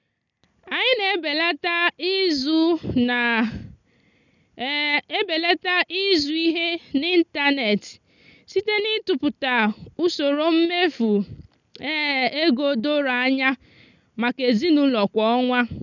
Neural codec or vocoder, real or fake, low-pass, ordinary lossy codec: none; real; 7.2 kHz; none